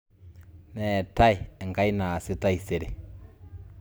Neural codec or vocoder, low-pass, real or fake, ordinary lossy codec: none; none; real; none